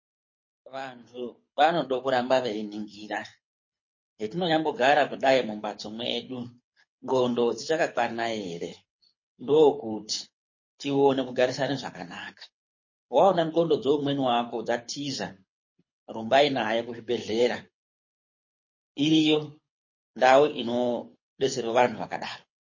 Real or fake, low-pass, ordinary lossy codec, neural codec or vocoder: fake; 7.2 kHz; MP3, 32 kbps; codec, 24 kHz, 6 kbps, HILCodec